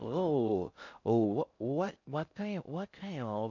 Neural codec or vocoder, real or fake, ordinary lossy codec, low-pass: codec, 16 kHz in and 24 kHz out, 0.6 kbps, FocalCodec, streaming, 4096 codes; fake; none; 7.2 kHz